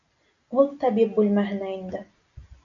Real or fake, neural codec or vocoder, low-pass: real; none; 7.2 kHz